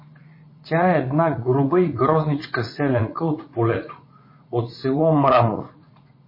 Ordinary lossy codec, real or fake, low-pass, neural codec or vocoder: MP3, 24 kbps; fake; 5.4 kHz; vocoder, 44.1 kHz, 80 mel bands, Vocos